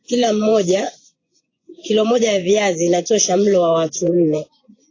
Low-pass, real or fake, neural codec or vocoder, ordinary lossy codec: 7.2 kHz; fake; vocoder, 24 kHz, 100 mel bands, Vocos; AAC, 32 kbps